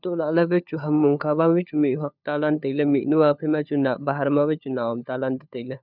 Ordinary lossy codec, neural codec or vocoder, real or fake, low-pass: none; codec, 16 kHz, 4 kbps, FunCodec, trained on LibriTTS, 50 frames a second; fake; 5.4 kHz